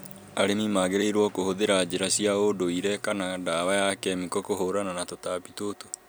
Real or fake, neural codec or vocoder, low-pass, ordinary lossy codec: real; none; none; none